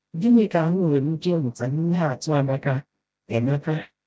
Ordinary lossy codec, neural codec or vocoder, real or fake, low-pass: none; codec, 16 kHz, 0.5 kbps, FreqCodec, smaller model; fake; none